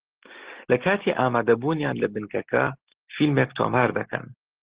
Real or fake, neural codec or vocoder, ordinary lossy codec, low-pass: real; none; Opus, 16 kbps; 3.6 kHz